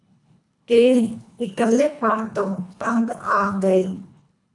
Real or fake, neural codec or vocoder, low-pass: fake; codec, 24 kHz, 1.5 kbps, HILCodec; 10.8 kHz